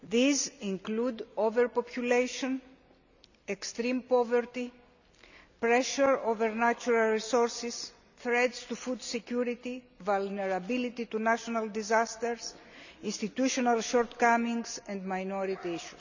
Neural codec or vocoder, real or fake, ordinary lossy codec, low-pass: none; real; none; 7.2 kHz